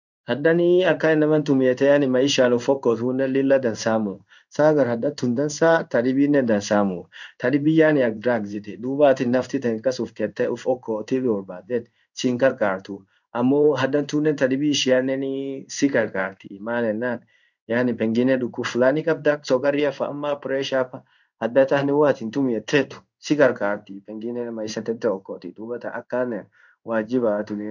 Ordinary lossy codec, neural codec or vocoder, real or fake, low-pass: none; codec, 16 kHz in and 24 kHz out, 1 kbps, XY-Tokenizer; fake; 7.2 kHz